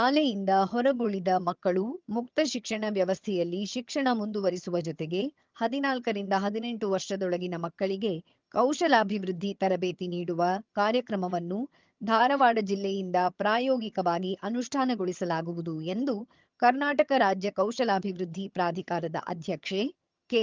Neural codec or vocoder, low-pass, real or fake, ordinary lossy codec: vocoder, 22.05 kHz, 80 mel bands, HiFi-GAN; 7.2 kHz; fake; Opus, 24 kbps